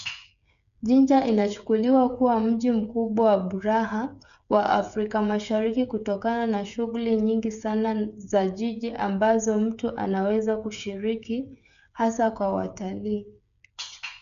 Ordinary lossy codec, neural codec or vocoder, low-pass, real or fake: none; codec, 16 kHz, 8 kbps, FreqCodec, smaller model; 7.2 kHz; fake